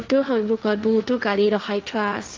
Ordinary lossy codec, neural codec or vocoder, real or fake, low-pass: Opus, 24 kbps; codec, 16 kHz, 1.1 kbps, Voila-Tokenizer; fake; 7.2 kHz